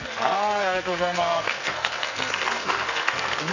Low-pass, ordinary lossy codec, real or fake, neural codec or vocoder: 7.2 kHz; none; fake; codec, 44.1 kHz, 2.6 kbps, SNAC